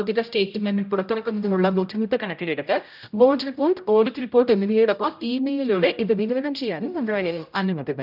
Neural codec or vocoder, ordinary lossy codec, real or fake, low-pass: codec, 16 kHz, 0.5 kbps, X-Codec, HuBERT features, trained on general audio; none; fake; 5.4 kHz